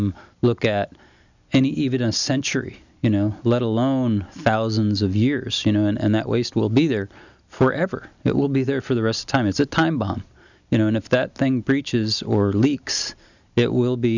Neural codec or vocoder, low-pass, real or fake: none; 7.2 kHz; real